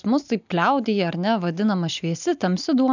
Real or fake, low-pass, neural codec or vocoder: real; 7.2 kHz; none